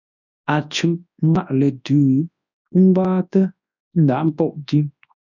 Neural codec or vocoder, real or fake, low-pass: codec, 24 kHz, 0.9 kbps, WavTokenizer, large speech release; fake; 7.2 kHz